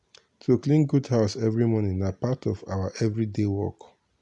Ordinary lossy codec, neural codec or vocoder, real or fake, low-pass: MP3, 96 kbps; none; real; 10.8 kHz